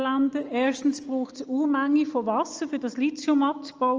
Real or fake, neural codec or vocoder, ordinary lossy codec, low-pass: real; none; Opus, 24 kbps; 7.2 kHz